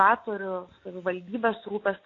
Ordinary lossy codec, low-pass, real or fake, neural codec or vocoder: AAC, 32 kbps; 7.2 kHz; real; none